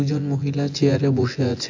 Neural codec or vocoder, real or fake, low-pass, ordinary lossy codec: vocoder, 24 kHz, 100 mel bands, Vocos; fake; 7.2 kHz; AAC, 48 kbps